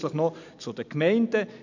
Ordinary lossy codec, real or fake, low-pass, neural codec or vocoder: none; real; 7.2 kHz; none